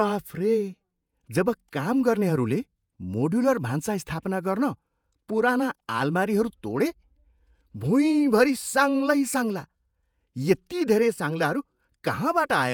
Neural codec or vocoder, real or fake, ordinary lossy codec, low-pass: vocoder, 48 kHz, 128 mel bands, Vocos; fake; none; 19.8 kHz